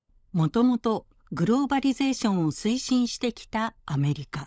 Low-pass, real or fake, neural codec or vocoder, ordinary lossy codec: none; fake; codec, 16 kHz, 16 kbps, FunCodec, trained on LibriTTS, 50 frames a second; none